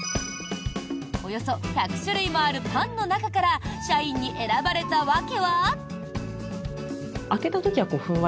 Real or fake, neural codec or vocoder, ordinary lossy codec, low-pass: real; none; none; none